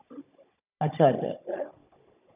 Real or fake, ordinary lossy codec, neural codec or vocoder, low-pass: fake; none; codec, 16 kHz, 16 kbps, FunCodec, trained on Chinese and English, 50 frames a second; 3.6 kHz